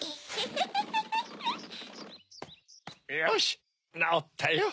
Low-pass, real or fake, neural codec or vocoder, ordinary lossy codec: none; real; none; none